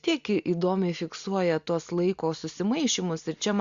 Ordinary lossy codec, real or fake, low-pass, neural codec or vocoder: Opus, 64 kbps; real; 7.2 kHz; none